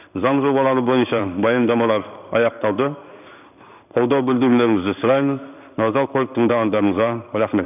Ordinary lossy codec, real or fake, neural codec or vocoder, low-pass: none; fake; codec, 16 kHz in and 24 kHz out, 1 kbps, XY-Tokenizer; 3.6 kHz